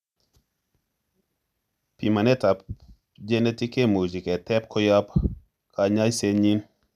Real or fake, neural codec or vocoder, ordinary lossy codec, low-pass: real; none; none; 14.4 kHz